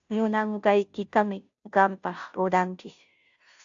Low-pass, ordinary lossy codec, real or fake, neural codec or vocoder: 7.2 kHz; AAC, 64 kbps; fake; codec, 16 kHz, 0.5 kbps, FunCodec, trained on Chinese and English, 25 frames a second